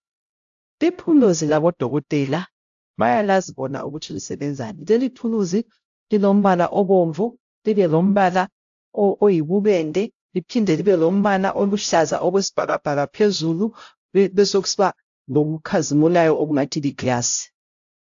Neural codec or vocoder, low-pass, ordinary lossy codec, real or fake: codec, 16 kHz, 0.5 kbps, X-Codec, HuBERT features, trained on LibriSpeech; 7.2 kHz; AAC, 64 kbps; fake